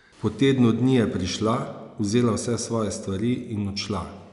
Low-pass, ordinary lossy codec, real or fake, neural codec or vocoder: 10.8 kHz; none; real; none